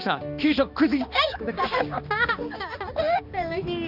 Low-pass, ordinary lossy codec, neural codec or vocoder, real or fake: 5.4 kHz; none; codec, 16 kHz, 2 kbps, X-Codec, HuBERT features, trained on balanced general audio; fake